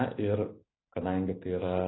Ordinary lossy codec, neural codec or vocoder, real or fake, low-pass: AAC, 16 kbps; none; real; 7.2 kHz